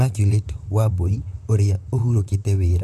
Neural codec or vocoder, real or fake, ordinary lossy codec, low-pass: vocoder, 44.1 kHz, 128 mel bands, Pupu-Vocoder; fake; none; 14.4 kHz